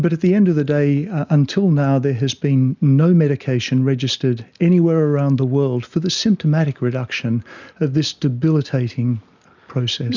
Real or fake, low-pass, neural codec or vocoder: real; 7.2 kHz; none